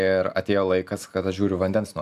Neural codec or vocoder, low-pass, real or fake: none; 14.4 kHz; real